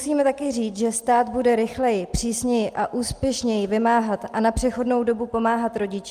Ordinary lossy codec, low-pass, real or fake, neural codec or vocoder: Opus, 32 kbps; 14.4 kHz; real; none